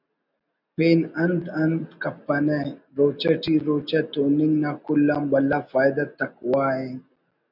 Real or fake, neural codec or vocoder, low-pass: real; none; 5.4 kHz